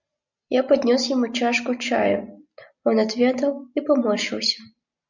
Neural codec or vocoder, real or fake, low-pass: none; real; 7.2 kHz